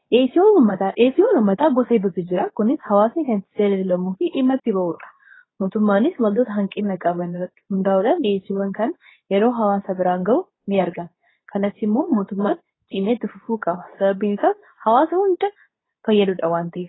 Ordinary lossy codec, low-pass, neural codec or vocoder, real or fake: AAC, 16 kbps; 7.2 kHz; codec, 24 kHz, 0.9 kbps, WavTokenizer, medium speech release version 2; fake